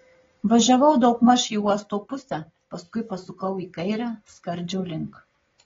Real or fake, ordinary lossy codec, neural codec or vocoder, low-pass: real; AAC, 24 kbps; none; 7.2 kHz